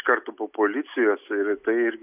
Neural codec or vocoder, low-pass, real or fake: none; 3.6 kHz; real